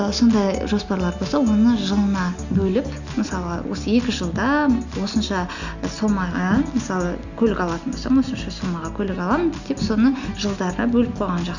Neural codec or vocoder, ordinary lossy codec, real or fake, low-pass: none; none; real; 7.2 kHz